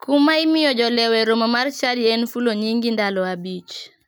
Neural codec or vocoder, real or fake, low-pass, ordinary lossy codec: none; real; none; none